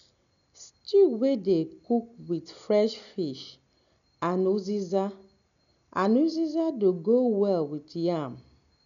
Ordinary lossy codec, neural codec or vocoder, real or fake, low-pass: none; none; real; 7.2 kHz